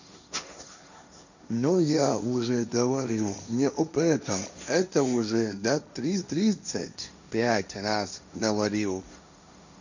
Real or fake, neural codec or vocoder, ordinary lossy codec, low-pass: fake; codec, 16 kHz, 1.1 kbps, Voila-Tokenizer; none; 7.2 kHz